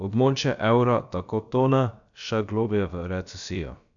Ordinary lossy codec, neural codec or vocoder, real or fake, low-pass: none; codec, 16 kHz, about 1 kbps, DyCAST, with the encoder's durations; fake; 7.2 kHz